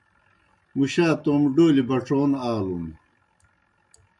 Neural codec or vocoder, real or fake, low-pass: none; real; 10.8 kHz